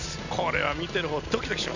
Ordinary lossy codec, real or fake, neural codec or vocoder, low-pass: none; real; none; 7.2 kHz